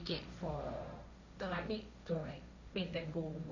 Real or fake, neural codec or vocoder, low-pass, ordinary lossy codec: fake; codec, 16 kHz, 1.1 kbps, Voila-Tokenizer; 7.2 kHz; none